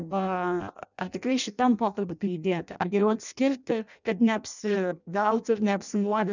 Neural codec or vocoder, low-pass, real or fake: codec, 16 kHz in and 24 kHz out, 0.6 kbps, FireRedTTS-2 codec; 7.2 kHz; fake